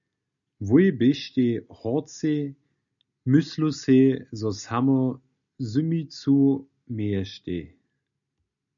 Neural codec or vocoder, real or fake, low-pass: none; real; 7.2 kHz